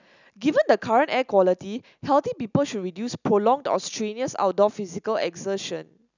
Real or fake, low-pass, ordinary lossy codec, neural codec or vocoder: real; 7.2 kHz; none; none